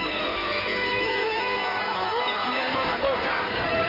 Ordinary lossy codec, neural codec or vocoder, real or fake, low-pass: none; codec, 16 kHz in and 24 kHz out, 1.1 kbps, FireRedTTS-2 codec; fake; 5.4 kHz